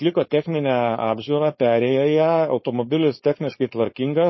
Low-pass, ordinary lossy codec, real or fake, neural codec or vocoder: 7.2 kHz; MP3, 24 kbps; fake; codec, 16 kHz, 4.8 kbps, FACodec